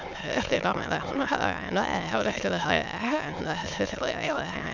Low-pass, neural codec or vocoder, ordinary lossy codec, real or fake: 7.2 kHz; autoencoder, 22.05 kHz, a latent of 192 numbers a frame, VITS, trained on many speakers; none; fake